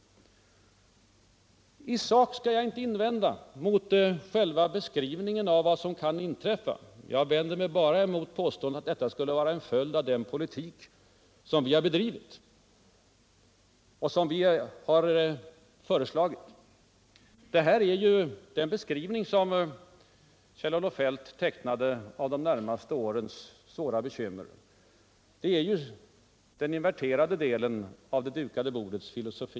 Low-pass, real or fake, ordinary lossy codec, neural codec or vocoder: none; real; none; none